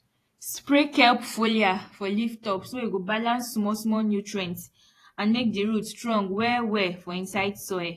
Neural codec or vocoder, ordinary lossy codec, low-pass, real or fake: vocoder, 48 kHz, 128 mel bands, Vocos; AAC, 48 kbps; 14.4 kHz; fake